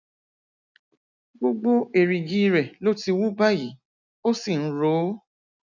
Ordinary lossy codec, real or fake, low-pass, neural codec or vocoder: none; real; 7.2 kHz; none